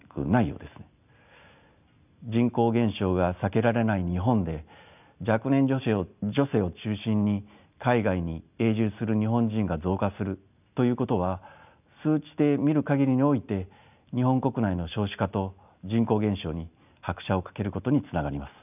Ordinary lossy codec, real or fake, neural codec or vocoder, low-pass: none; real; none; 3.6 kHz